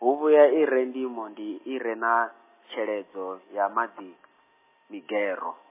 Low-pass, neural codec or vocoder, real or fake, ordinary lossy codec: 3.6 kHz; none; real; MP3, 16 kbps